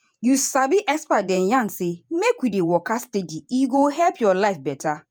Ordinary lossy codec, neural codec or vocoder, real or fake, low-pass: none; vocoder, 48 kHz, 128 mel bands, Vocos; fake; none